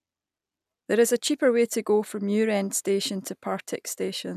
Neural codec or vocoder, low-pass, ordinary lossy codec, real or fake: none; 14.4 kHz; none; real